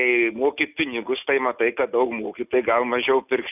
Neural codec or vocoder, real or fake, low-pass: none; real; 3.6 kHz